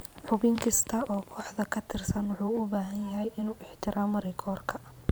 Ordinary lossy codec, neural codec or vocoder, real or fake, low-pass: none; vocoder, 44.1 kHz, 128 mel bands every 512 samples, BigVGAN v2; fake; none